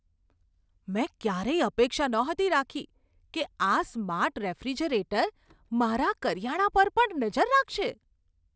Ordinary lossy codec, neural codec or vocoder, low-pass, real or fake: none; none; none; real